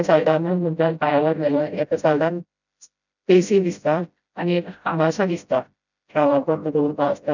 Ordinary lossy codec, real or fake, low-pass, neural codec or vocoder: none; fake; 7.2 kHz; codec, 16 kHz, 0.5 kbps, FreqCodec, smaller model